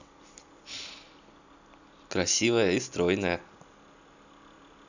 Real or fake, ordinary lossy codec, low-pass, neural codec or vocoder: real; none; 7.2 kHz; none